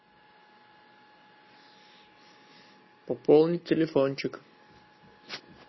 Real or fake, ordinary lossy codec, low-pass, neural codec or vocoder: real; MP3, 24 kbps; 7.2 kHz; none